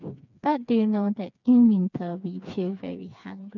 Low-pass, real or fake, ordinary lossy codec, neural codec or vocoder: 7.2 kHz; fake; none; codec, 16 kHz, 4 kbps, FreqCodec, smaller model